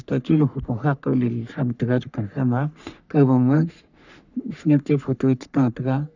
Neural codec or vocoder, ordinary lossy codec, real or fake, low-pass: codec, 44.1 kHz, 3.4 kbps, Pupu-Codec; none; fake; 7.2 kHz